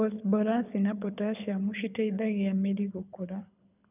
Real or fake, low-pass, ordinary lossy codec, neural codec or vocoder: fake; 3.6 kHz; none; codec, 24 kHz, 6 kbps, HILCodec